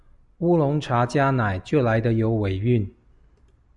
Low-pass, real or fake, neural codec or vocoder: 10.8 kHz; real; none